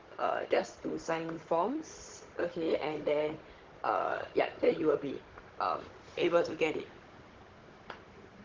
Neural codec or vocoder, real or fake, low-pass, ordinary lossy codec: codec, 16 kHz, 16 kbps, FunCodec, trained on Chinese and English, 50 frames a second; fake; 7.2 kHz; Opus, 16 kbps